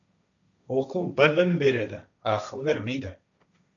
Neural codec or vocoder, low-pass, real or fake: codec, 16 kHz, 1.1 kbps, Voila-Tokenizer; 7.2 kHz; fake